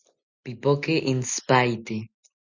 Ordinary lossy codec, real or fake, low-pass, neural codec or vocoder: Opus, 64 kbps; real; 7.2 kHz; none